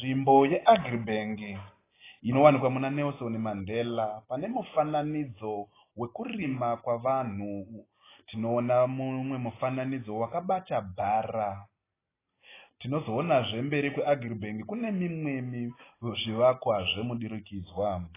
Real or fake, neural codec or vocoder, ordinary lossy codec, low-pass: real; none; AAC, 16 kbps; 3.6 kHz